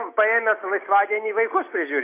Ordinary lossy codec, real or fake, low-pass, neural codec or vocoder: AAC, 24 kbps; real; 3.6 kHz; none